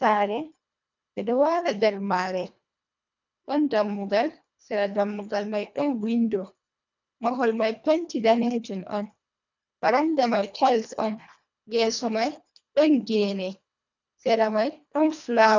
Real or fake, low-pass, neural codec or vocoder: fake; 7.2 kHz; codec, 24 kHz, 1.5 kbps, HILCodec